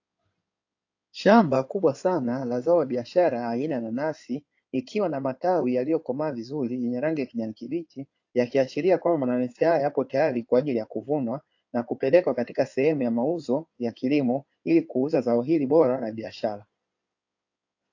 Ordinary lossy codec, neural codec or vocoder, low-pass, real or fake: AAC, 48 kbps; codec, 16 kHz in and 24 kHz out, 2.2 kbps, FireRedTTS-2 codec; 7.2 kHz; fake